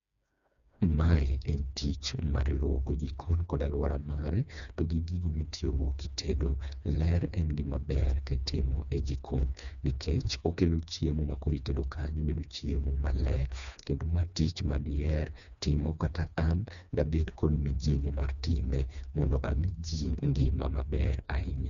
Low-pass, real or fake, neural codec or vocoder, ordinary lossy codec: 7.2 kHz; fake; codec, 16 kHz, 2 kbps, FreqCodec, smaller model; none